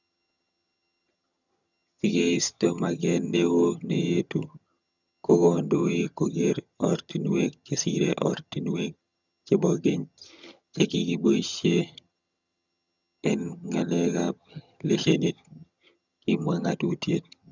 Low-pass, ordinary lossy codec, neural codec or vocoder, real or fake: 7.2 kHz; none; vocoder, 22.05 kHz, 80 mel bands, HiFi-GAN; fake